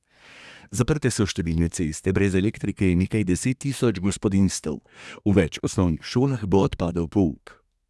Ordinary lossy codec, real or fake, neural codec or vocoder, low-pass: none; fake; codec, 24 kHz, 1 kbps, SNAC; none